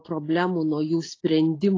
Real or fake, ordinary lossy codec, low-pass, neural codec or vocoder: real; AAC, 32 kbps; 7.2 kHz; none